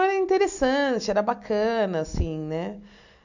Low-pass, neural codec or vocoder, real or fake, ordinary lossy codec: 7.2 kHz; none; real; none